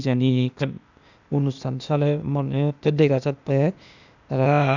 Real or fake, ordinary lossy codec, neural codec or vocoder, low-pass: fake; none; codec, 16 kHz, 0.8 kbps, ZipCodec; 7.2 kHz